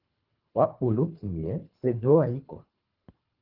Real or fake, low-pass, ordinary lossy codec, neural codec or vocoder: fake; 5.4 kHz; Opus, 32 kbps; codec, 24 kHz, 3 kbps, HILCodec